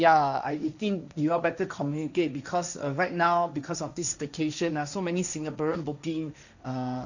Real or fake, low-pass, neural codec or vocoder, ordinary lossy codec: fake; 7.2 kHz; codec, 16 kHz, 1.1 kbps, Voila-Tokenizer; none